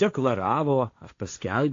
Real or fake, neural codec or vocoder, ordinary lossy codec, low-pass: fake; codec, 16 kHz, 1.1 kbps, Voila-Tokenizer; AAC, 48 kbps; 7.2 kHz